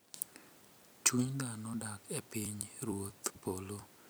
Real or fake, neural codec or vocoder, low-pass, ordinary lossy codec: fake; vocoder, 44.1 kHz, 128 mel bands every 256 samples, BigVGAN v2; none; none